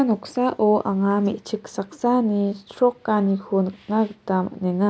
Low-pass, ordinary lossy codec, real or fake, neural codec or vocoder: none; none; real; none